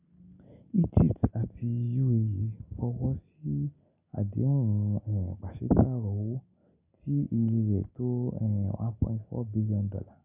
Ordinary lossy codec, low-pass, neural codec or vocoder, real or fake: none; 3.6 kHz; none; real